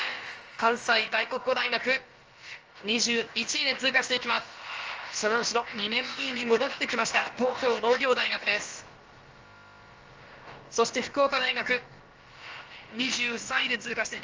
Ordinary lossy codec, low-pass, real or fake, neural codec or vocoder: Opus, 24 kbps; 7.2 kHz; fake; codec, 16 kHz, about 1 kbps, DyCAST, with the encoder's durations